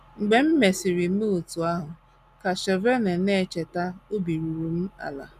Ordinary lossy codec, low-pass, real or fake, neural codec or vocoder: none; 14.4 kHz; real; none